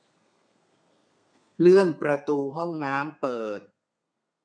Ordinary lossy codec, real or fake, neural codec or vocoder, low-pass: none; fake; codec, 32 kHz, 1.9 kbps, SNAC; 9.9 kHz